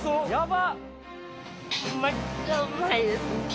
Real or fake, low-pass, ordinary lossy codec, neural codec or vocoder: real; none; none; none